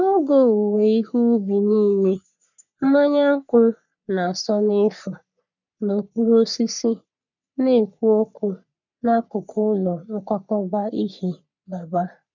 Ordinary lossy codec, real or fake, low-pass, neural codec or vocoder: none; fake; 7.2 kHz; codec, 44.1 kHz, 3.4 kbps, Pupu-Codec